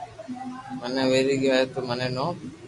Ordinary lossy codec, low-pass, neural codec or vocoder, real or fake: MP3, 64 kbps; 10.8 kHz; none; real